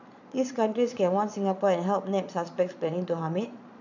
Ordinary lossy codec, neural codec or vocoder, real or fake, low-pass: none; vocoder, 22.05 kHz, 80 mel bands, Vocos; fake; 7.2 kHz